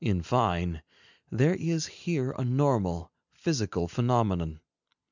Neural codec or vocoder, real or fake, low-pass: none; real; 7.2 kHz